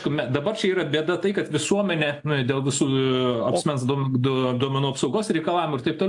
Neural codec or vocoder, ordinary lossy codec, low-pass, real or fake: none; AAC, 64 kbps; 10.8 kHz; real